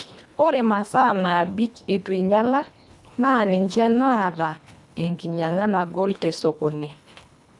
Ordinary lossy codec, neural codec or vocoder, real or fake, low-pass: none; codec, 24 kHz, 1.5 kbps, HILCodec; fake; none